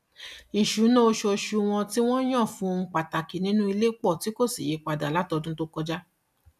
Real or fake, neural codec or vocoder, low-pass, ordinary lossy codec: real; none; 14.4 kHz; none